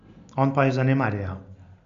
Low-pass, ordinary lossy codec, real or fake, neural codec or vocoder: 7.2 kHz; MP3, 64 kbps; real; none